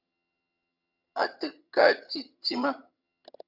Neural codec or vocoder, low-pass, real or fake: vocoder, 22.05 kHz, 80 mel bands, HiFi-GAN; 5.4 kHz; fake